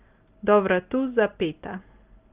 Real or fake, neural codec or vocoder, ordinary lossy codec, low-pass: real; none; Opus, 32 kbps; 3.6 kHz